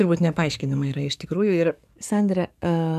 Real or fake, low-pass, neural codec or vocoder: fake; 14.4 kHz; codec, 44.1 kHz, 7.8 kbps, DAC